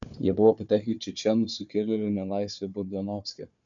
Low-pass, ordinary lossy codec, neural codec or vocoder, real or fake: 7.2 kHz; MP3, 64 kbps; codec, 16 kHz, 4 kbps, FunCodec, trained on Chinese and English, 50 frames a second; fake